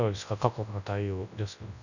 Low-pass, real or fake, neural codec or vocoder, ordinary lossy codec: 7.2 kHz; fake; codec, 24 kHz, 0.9 kbps, WavTokenizer, large speech release; none